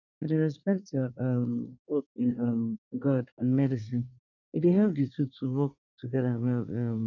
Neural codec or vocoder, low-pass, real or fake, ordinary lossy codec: codec, 24 kHz, 1 kbps, SNAC; 7.2 kHz; fake; none